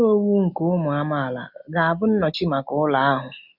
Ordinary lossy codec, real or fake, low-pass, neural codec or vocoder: none; real; 5.4 kHz; none